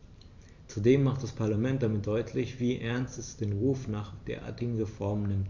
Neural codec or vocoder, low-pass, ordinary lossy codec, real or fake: vocoder, 44.1 kHz, 128 mel bands every 512 samples, BigVGAN v2; 7.2 kHz; none; fake